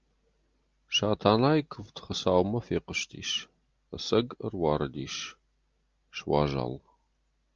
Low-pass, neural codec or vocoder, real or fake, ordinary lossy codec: 7.2 kHz; none; real; Opus, 24 kbps